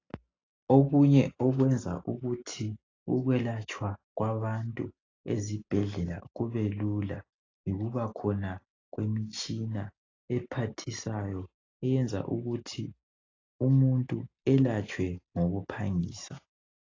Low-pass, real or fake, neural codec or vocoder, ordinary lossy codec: 7.2 kHz; real; none; AAC, 32 kbps